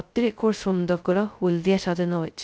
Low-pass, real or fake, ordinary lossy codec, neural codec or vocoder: none; fake; none; codec, 16 kHz, 0.2 kbps, FocalCodec